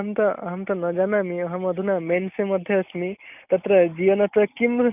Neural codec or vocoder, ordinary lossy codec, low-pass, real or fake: none; none; 3.6 kHz; real